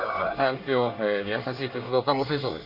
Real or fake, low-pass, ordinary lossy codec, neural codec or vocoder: fake; 5.4 kHz; Opus, 64 kbps; codec, 24 kHz, 1 kbps, SNAC